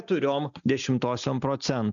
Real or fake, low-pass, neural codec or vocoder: real; 7.2 kHz; none